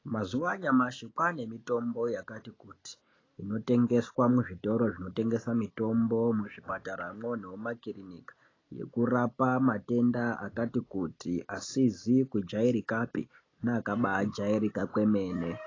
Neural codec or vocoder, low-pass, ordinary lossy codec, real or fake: none; 7.2 kHz; AAC, 32 kbps; real